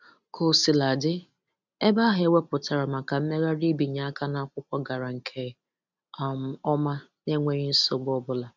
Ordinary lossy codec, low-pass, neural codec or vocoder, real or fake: none; 7.2 kHz; none; real